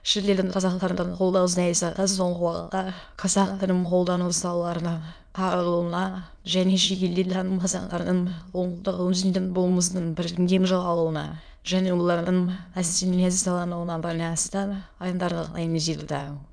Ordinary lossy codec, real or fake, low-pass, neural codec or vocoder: none; fake; 9.9 kHz; autoencoder, 22.05 kHz, a latent of 192 numbers a frame, VITS, trained on many speakers